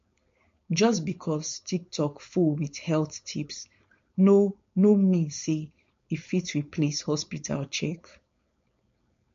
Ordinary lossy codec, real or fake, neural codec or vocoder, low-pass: MP3, 48 kbps; fake; codec, 16 kHz, 4.8 kbps, FACodec; 7.2 kHz